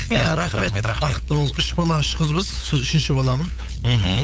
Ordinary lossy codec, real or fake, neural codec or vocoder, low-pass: none; fake; codec, 16 kHz, 8 kbps, FunCodec, trained on LibriTTS, 25 frames a second; none